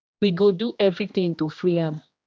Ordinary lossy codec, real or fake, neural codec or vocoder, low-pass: none; fake; codec, 16 kHz, 1 kbps, X-Codec, HuBERT features, trained on general audio; none